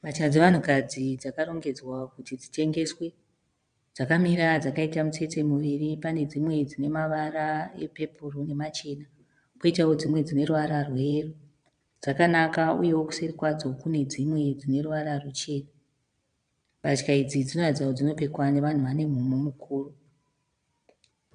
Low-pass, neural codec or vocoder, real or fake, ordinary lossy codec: 9.9 kHz; vocoder, 22.05 kHz, 80 mel bands, Vocos; fake; MP3, 96 kbps